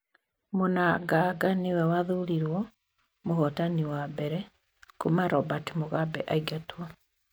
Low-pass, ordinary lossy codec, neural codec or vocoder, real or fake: none; none; none; real